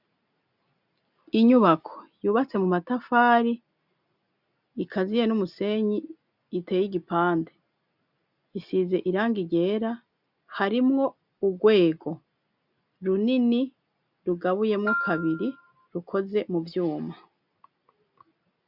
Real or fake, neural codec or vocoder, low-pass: real; none; 5.4 kHz